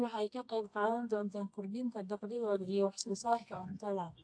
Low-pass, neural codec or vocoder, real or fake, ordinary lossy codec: 9.9 kHz; codec, 24 kHz, 0.9 kbps, WavTokenizer, medium music audio release; fake; AAC, 64 kbps